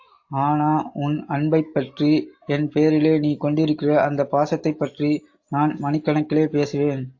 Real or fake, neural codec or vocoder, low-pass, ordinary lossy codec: real; none; 7.2 kHz; AAC, 48 kbps